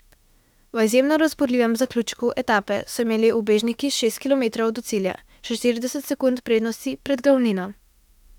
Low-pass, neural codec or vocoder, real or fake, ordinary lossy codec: 19.8 kHz; autoencoder, 48 kHz, 32 numbers a frame, DAC-VAE, trained on Japanese speech; fake; none